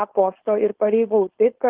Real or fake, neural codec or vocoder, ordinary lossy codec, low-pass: fake; codec, 16 kHz, 4.8 kbps, FACodec; Opus, 16 kbps; 3.6 kHz